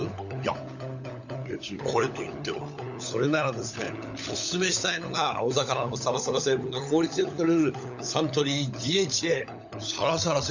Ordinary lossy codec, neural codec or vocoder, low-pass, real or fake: none; codec, 16 kHz, 16 kbps, FunCodec, trained on LibriTTS, 50 frames a second; 7.2 kHz; fake